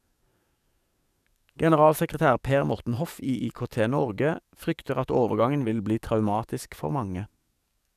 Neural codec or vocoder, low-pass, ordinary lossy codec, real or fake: codec, 44.1 kHz, 7.8 kbps, DAC; 14.4 kHz; none; fake